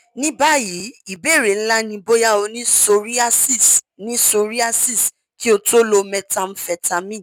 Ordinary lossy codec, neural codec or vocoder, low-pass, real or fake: none; none; 19.8 kHz; real